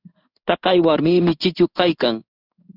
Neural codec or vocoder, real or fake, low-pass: codec, 16 kHz in and 24 kHz out, 1 kbps, XY-Tokenizer; fake; 5.4 kHz